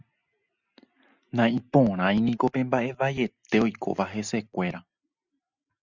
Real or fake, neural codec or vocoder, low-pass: real; none; 7.2 kHz